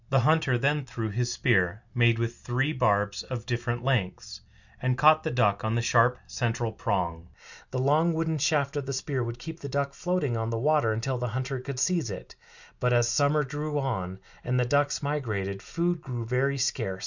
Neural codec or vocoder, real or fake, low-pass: none; real; 7.2 kHz